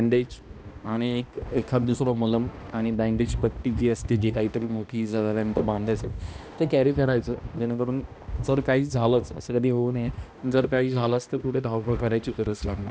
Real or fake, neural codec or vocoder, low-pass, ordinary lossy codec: fake; codec, 16 kHz, 1 kbps, X-Codec, HuBERT features, trained on balanced general audio; none; none